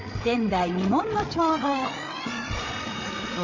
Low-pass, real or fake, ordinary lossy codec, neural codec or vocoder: 7.2 kHz; fake; MP3, 64 kbps; codec, 16 kHz, 8 kbps, FreqCodec, larger model